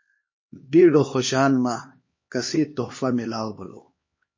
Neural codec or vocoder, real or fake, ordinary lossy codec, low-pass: codec, 16 kHz, 2 kbps, X-Codec, HuBERT features, trained on LibriSpeech; fake; MP3, 32 kbps; 7.2 kHz